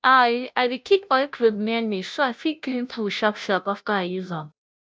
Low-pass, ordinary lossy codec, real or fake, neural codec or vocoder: none; none; fake; codec, 16 kHz, 0.5 kbps, FunCodec, trained on Chinese and English, 25 frames a second